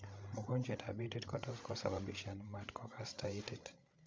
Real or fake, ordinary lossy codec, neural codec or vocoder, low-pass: fake; none; codec, 16 kHz, 16 kbps, FreqCodec, larger model; none